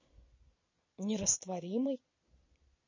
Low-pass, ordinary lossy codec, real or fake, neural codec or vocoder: 7.2 kHz; MP3, 32 kbps; fake; vocoder, 22.05 kHz, 80 mel bands, Vocos